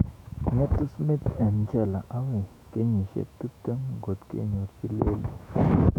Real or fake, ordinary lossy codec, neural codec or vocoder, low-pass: fake; none; autoencoder, 48 kHz, 128 numbers a frame, DAC-VAE, trained on Japanese speech; 19.8 kHz